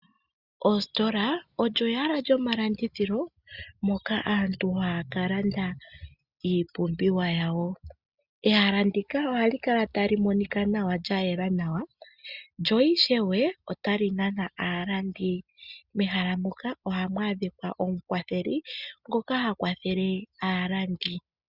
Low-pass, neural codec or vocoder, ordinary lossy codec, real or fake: 5.4 kHz; none; Opus, 64 kbps; real